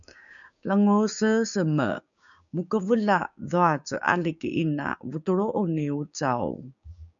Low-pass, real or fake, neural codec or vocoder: 7.2 kHz; fake; codec, 16 kHz, 6 kbps, DAC